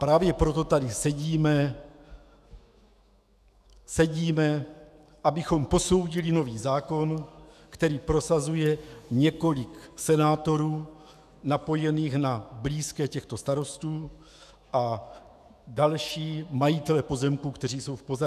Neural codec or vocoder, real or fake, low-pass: autoencoder, 48 kHz, 128 numbers a frame, DAC-VAE, trained on Japanese speech; fake; 14.4 kHz